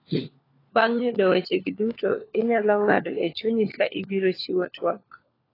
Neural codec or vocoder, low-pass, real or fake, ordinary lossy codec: codec, 16 kHz, 4 kbps, FunCodec, trained on LibriTTS, 50 frames a second; 5.4 kHz; fake; AAC, 24 kbps